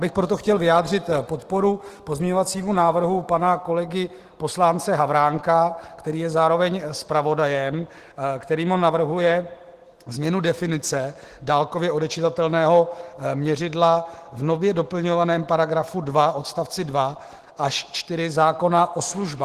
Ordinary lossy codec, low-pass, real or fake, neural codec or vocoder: Opus, 16 kbps; 14.4 kHz; fake; autoencoder, 48 kHz, 128 numbers a frame, DAC-VAE, trained on Japanese speech